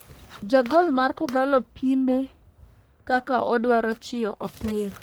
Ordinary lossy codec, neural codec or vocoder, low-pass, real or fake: none; codec, 44.1 kHz, 1.7 kbps, Pupu-Codec; none; fake